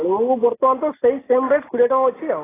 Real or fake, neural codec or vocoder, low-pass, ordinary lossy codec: real; none; 3.6 kHz; AAC, 16 kbps